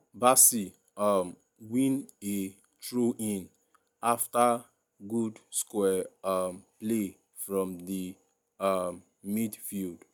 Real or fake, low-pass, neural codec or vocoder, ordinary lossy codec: real; none; none; none